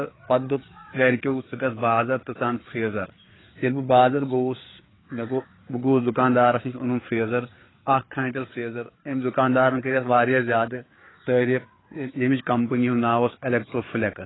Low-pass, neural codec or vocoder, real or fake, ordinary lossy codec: 7.2 kHz; codec, 16 kHz, 4 kbps, FreqCodec, larger model; fake; AAC, 16 kbps